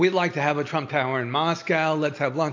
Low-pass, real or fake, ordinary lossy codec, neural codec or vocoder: 7.2 kHz; real; AAC, 48 kbps; none